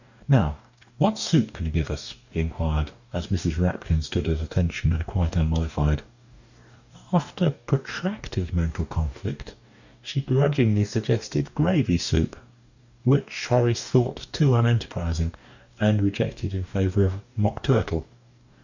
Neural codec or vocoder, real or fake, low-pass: codec, 44.1 kHz, 2.6 kbps, DAC; fake; 7.2 kHz